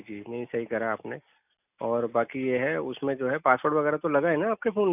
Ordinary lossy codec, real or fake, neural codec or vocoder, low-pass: none; real; none; 3.6 kHz